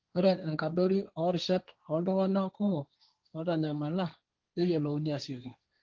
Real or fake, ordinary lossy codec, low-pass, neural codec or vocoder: fake; Opus, 32 kbps; 7.2 kHz; codec, 16 kHz, 1.1 kbps, Voila-Tokenizer